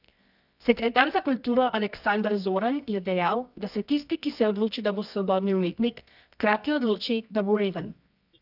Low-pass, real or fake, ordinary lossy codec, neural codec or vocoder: 5.4 kHz; fake; none; codec, 24 kHz, 0.9 kbps, WavTokenizer, medium music audio release